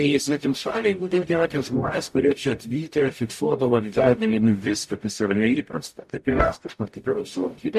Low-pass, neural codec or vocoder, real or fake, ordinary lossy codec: 14.4 kHz; codec, 44.1 kHz, 0.9 kbps, DAC; fake; MP3, 64 kbps